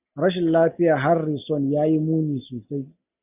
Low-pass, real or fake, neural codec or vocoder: 3.6 kHz; real; none